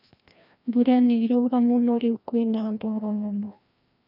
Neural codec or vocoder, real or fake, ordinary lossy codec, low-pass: codec, 16 kHz, 1 kbps, FreqCodec, larger model; fake; none; 5.4 kHz